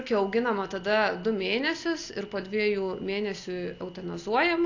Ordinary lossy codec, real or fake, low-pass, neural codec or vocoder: AAC, 48 kbps; real; 7.2 kHz; none